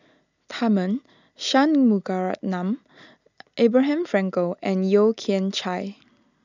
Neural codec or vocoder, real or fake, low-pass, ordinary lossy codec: none; real; 7.2 kHz; none